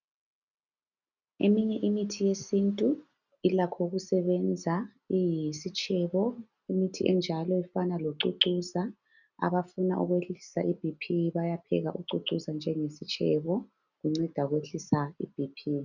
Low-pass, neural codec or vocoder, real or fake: 7.2 kHz; none; real